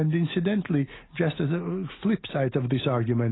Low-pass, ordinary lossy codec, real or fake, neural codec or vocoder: 7.2 kHz; AAC, 16 kbps; real; none